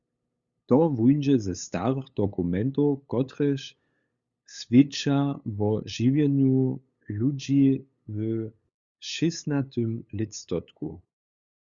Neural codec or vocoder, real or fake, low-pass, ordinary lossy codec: codec, 16 kHz, 8 kbps, FunCodec, trained on LibriTTS, 25 frames a second; fake; 7.2 kHz; Opus, 64 kbps